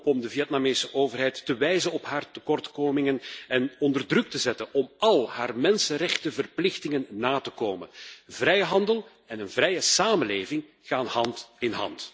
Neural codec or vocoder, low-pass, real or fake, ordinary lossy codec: none; none; real; none